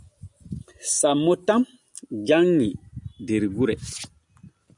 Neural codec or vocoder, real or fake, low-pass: none; real; 10.8 kHz